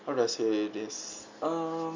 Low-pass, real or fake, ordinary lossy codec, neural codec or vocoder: 7.2 kHz; real; MP3, 64 kbps; none